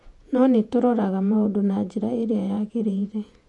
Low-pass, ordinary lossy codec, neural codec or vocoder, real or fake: 10.8 kHz; none; vocoder, 48 kHz, 128 mel bands, Vocos; fake